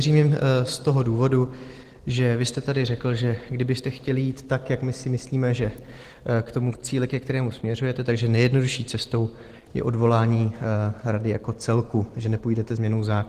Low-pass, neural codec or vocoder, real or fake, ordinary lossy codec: 14.4 kHz; none; real; Opus, 16 kbps